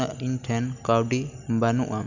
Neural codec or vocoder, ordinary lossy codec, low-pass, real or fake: none; none; 7.2 kHz; real